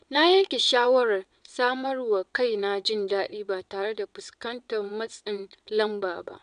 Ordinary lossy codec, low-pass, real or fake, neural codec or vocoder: none; 9.9 kHz; fake; vocoder, 22.05 kHz, 80 mel bands, WaveNeXt